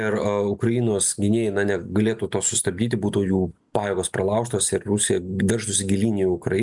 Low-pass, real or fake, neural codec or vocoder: 10.8 kHz; real; none